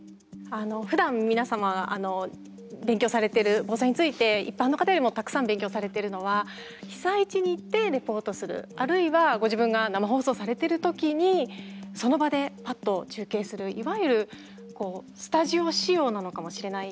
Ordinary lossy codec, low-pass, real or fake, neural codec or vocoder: none; none; real; none